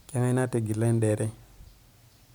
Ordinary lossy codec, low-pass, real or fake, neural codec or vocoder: none; none; real; none